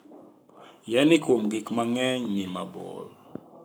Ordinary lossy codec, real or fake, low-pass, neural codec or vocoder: none; fake; none; codec, 44.1 kHz, 7.8 kbps, Pupu-Codec